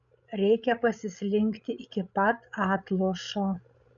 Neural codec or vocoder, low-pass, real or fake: codec, 16 kHz, 16 kbps, FreqCodec, larger model; 7.2 kHz; fake